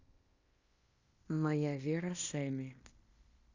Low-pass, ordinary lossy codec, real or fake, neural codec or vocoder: 7.2 kHz; Opus, 64 kbps; fake; codec, 16 kHz in and 24 kHz out, 0.9 kbps, LongCat-Audio-Codec, fine tuned four codebook decoder